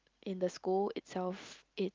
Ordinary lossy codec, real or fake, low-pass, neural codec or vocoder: Opus, 24 kbps; real; 7.2 kHz; none